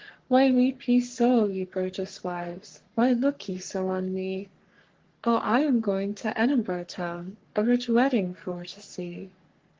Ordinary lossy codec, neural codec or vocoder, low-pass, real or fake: Opus, 16 kbps; codec, 44.1 kHz, 3.4 kbps, Pupu-Codec; 7.2 kHz; fake